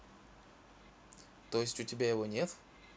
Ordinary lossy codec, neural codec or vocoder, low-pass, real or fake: none; none; none; real